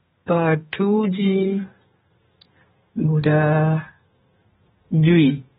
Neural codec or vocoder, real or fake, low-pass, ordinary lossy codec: codec, 32 kHz, 1.9 kbps, SNAC; fake; 14.4 kHz; AAC, 16 kbps